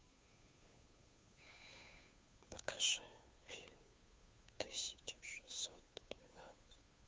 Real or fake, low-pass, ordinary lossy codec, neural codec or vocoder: fake; none; none; codec, 16 kHz, 2 kbps, FunCodec, trained on Chinese and English, 25 frames a second